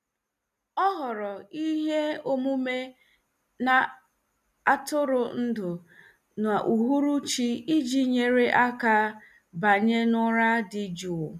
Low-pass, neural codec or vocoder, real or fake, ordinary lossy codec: 14.4 kHz; none; real; none